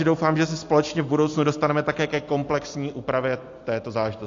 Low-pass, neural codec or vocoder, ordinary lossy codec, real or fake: 7.2 kHz; none; AAC, 48 kbps; real